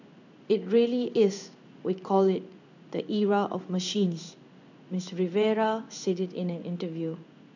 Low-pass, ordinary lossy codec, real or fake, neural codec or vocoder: 7.2 kHz; none; fake; codec, 16 kHz in and 24 kHz out, 1 kbps, XY-Tokenizer